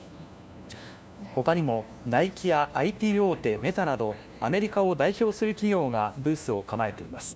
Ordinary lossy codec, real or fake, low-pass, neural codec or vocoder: none; fake; none; codec, 16 kHz, 1 kbps, FunCodec, trained on LibriTTS, 50 frames a second